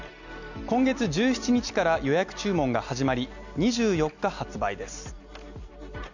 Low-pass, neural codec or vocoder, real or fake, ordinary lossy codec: 7.2 kHz; none; real; none